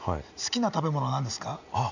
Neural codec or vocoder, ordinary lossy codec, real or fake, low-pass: none; none; real; 7.2 kHz